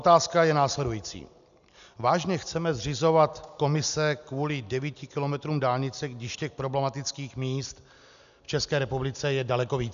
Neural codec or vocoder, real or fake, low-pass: none; real; 7.2 kHz